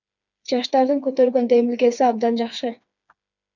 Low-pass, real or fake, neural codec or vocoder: 7.2 kHz; fake; codec, 16 kHz, 4 kbps, FreqCodec, smaller model